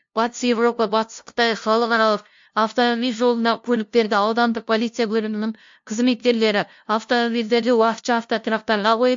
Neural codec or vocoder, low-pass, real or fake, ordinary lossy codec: codec, 16 kHz, 0.5 kbps, FunCodec, trained on LibriTTS, 25 frames a second; 7.2 kHz; fake; MP3, 48 kbps